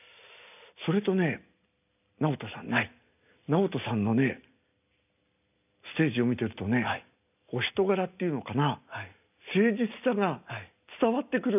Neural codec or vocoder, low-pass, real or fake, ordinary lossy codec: none; 3.6 kHz; real; none